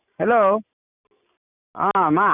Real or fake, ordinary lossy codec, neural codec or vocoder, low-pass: real; none; none; 3.6 kHz